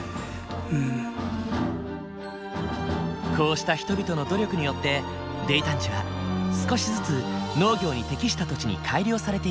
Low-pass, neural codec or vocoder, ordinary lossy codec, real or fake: none; none; none; real